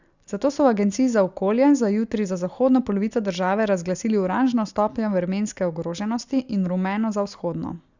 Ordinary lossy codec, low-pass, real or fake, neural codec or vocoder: Opus, 64 kbps; 7.2 kHz; real; none